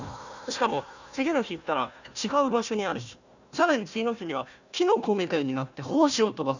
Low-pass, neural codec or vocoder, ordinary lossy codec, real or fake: 7.2 kHz; codec, 16 kHz, 1 kbps, FunCodec, trained on Chinese and English, 50 frames a second; none; fake